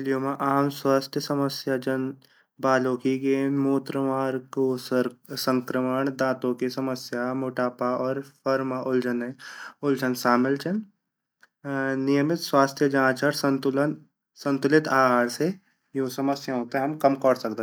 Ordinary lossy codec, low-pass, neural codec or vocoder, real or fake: none; none; none; real